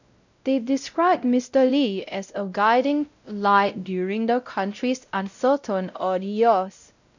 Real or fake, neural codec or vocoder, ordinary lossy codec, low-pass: fake; codec, 16 kHz, 0.5 kbps, X-Codec, WavLM features, trained on Multilingual LibriSpeech; none; 7.2 kHz